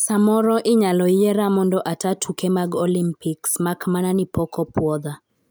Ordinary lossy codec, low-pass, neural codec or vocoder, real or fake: none; none; none; real